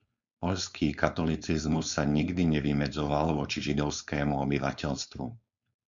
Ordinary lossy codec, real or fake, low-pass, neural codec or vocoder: MP3, 64 kbps; fake; 7.2 kHz; codec, 16 kHz, 4.8 kbps, FACodec